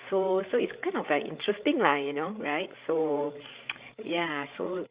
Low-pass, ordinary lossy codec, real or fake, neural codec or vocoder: 3.6 kHz; Opus, 64 kbps; fake; codec, 16 kHz, 8 kbps, FreqCodec, larger model